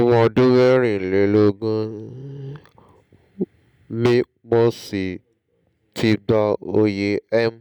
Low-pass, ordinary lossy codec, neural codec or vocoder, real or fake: 19.8 kHz; none; none; real